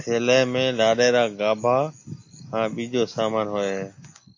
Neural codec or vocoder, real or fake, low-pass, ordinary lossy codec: none; real; 7.2 kHz; AAC, 48 kbps